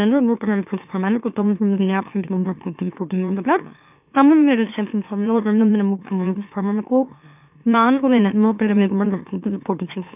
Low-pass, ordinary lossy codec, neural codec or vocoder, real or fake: 3.6 kHz; none; autoencoder, 44.1 kHz, a latent of 192 numbers a frame, MeloTTS; fake